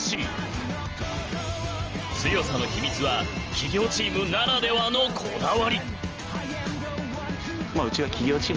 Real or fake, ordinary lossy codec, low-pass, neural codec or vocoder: real; Opus, 24 kbps; 7.2 kHz; none